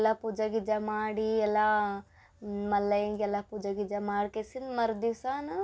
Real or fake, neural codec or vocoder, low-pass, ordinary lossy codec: real; none; none; none